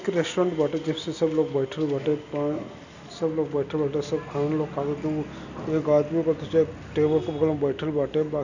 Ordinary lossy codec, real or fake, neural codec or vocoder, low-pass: none; real; none; 7.2 kHz